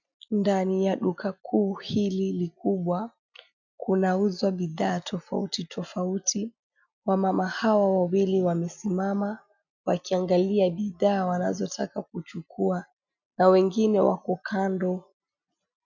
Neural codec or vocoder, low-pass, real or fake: none; 7.2 kHz; real